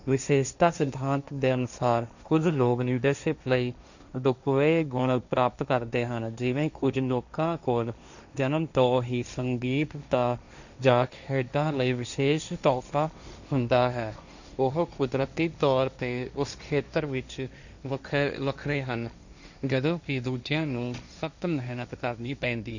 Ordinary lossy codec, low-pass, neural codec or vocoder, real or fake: none; 7.2 kHz; codec, 16 kHz, 1.1 kbps, Voila-Tokenizer; fake